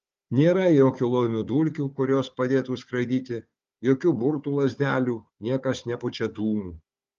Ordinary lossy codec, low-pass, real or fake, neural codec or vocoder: Opus, 32 kbps; 7.2 kHz; fake; codec, 16 kHz, 4 kbps, FunCodec, trained on Chinese and English, 50 frames a second